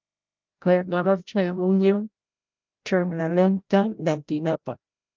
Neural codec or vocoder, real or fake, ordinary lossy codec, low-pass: codec, 16 kHz, 0.5 kbps, FreqCodec, larger model; fake; Opus, 24 kbps; 7.2 kHz